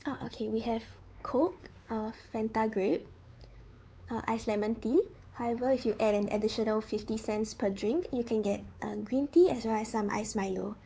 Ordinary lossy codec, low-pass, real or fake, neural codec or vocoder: none; none; fake; codec, 16 kHz, 4 kbps, X-Codec, WavLM features, trained on Multilingual LibriSpeech